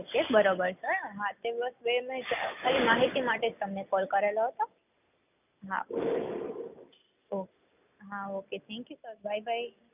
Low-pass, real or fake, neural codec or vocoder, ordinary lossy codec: 3.6 kHz; real; none; none